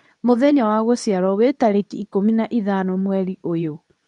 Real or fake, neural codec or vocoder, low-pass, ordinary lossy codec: fake; codec, 24 kHz, 0.9 kbps, WavTokenizer, medium speech release version 2; 10.8 kHz; none